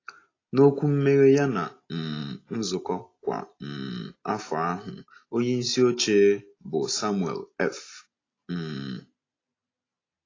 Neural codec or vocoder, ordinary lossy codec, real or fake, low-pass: none; AAC, 32 kbps; real; 7.2 kHz